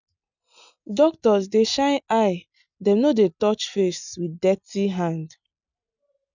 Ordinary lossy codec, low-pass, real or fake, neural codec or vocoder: none; 7.2 kHz; real; none